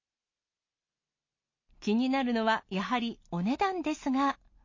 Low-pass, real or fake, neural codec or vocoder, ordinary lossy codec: 7.2 kHz; real; none; MP3, 32 kbps